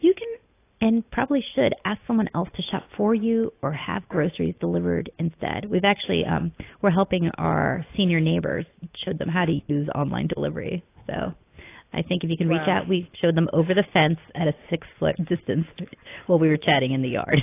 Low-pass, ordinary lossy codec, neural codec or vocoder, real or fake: 3.6 kHz; AAC, 24 kbps; none; real